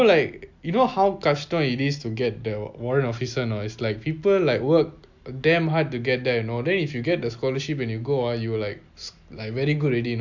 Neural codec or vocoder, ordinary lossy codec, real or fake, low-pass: none; MP3, 64 kbps; real; 7.2 kHz